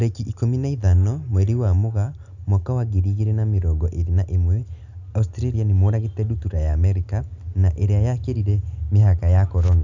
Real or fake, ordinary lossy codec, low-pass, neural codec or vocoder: real; none; 7.2 kHz; none